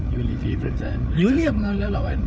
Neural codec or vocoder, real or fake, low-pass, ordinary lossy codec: codec, 16 kHz, 8 kbps, FreqCodec, larger model; fake; none; none